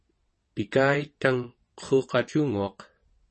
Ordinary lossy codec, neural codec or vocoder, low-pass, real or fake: MP3, 32 kbps; vocoder, 22.05 kHz, 80 mel bands, WaveNeXt; 9.9 kHz; fake